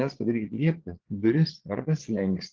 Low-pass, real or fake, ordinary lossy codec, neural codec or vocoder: 7.2 kHz; fake; Opus, 16 kbps; vocoder, 22.05 kHz, 80 mel bands, Vocos